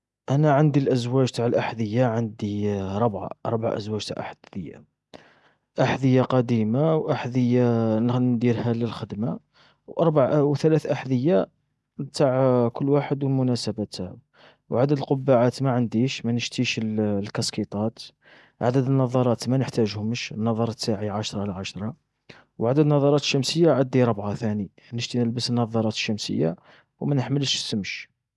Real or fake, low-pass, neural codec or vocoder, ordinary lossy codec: real; none; none; none